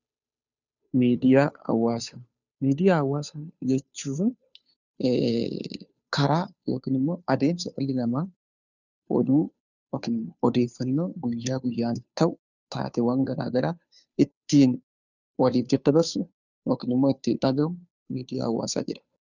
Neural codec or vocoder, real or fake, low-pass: codec, 16 kHz, 2 kbps, FunCodec, trained on Chinese and English, 25 frames a second; fake; 7.2 kHz